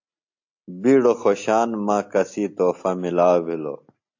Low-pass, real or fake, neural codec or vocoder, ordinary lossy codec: 7.2 kHz; real; none; AAC, 48 kbps